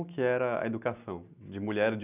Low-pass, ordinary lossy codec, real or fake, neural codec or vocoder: 3.6 kHz; none; real; none